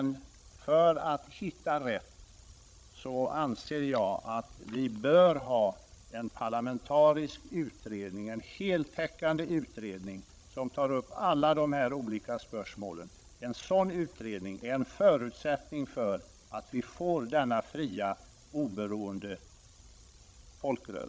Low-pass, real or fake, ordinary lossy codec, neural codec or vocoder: none; fake; none; codec, 16 kHz, 16 kbps, FreqCodec, larger model